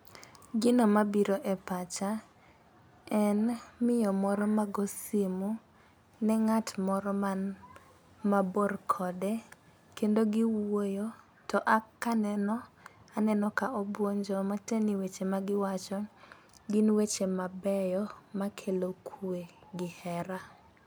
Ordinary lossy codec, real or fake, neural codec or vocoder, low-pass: none; real; none; none